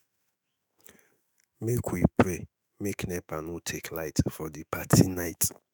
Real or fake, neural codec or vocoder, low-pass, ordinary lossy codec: fake; autoencoder, 48 kHz, 128 numbers a frame, DAC-VAE, trained on Japanese speech; none; none